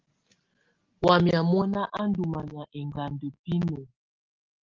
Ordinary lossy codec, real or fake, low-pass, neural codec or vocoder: Opus, 16 kbps; real; 7.2 kHz; none